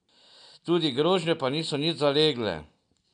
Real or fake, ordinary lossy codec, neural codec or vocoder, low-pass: real; none; none; 9.9 kHz